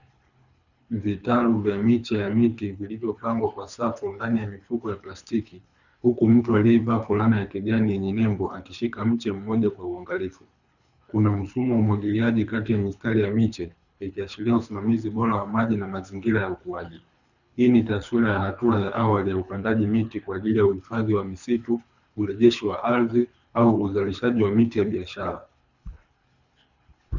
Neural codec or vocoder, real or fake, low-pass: codec, 24 kHz, 3 kbps, HILCodec; fake; 7.2 kHz